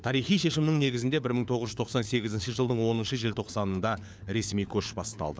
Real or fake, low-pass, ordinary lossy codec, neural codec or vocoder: fake; none; none; codec, 16 kHz, 4 kbps, FunCodec, trained on LibriTTS, 50 frames a second